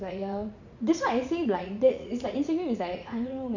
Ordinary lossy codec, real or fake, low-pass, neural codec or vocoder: none; fake; 7.2 kHz; vocoder, 44.1 kHz, 80 mel bands, Vocos